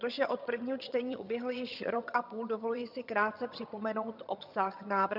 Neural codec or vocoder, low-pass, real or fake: vocoder, 22.05 kHz, 80 mel bands, HiFi-GAN; 5.4 kHz; fake